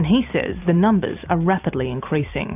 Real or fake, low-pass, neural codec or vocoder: fake; 3.6 kHz; vocoder, 44.1 kHz, 128 mel bands every 256 samples, BigVGAN v2